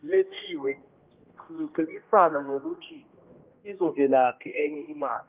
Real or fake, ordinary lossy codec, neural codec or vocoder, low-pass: fake; Opus, 32 kbps; codec, 16 kHz, 1 kbps, X-Codec, HuBERT features, trained on general audio; 3.6 kHz